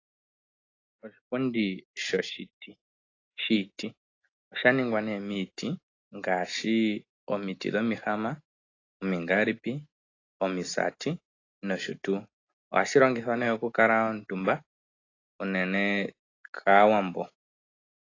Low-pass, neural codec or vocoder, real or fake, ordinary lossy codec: 7.2 kHz; none; real; AAC, 32 kbps